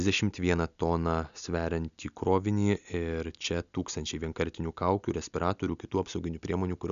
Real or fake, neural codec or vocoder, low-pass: real; none; 7.2 kHz